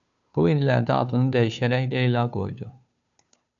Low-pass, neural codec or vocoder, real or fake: 7.2 kHz; codec, 16 kHz, 2 kbps, FunCodec, trained on Chinese and English, 25 frames a second; fake